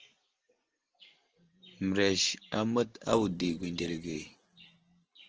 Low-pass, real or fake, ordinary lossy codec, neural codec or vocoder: 7.2 kHz; real; Opus, 16 kbps; none